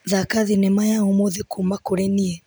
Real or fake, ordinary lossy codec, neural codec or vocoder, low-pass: real; none; none; none